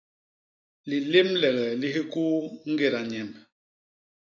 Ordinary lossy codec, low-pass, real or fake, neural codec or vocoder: AAC, 48 kbps; 7.2 kHz; real; none